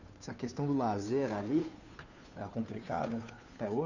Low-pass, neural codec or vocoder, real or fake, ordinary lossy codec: 7.2 kHz; codec, 16 kHz, 2 kbps, FunCodec, trained on Chinese and English, 25 frames a second; fake; none